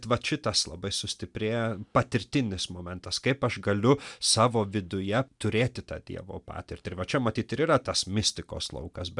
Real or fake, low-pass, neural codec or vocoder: real; 10.8 kHz; none